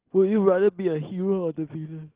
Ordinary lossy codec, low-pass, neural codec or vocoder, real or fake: Opus, 16 kbps; 3.6 kHz; none; real